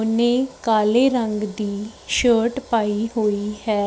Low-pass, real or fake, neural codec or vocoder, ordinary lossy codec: none; real; none; none